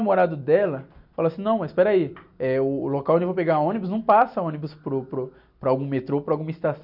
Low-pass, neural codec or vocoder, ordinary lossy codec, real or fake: 5.4 kHz; none; none; real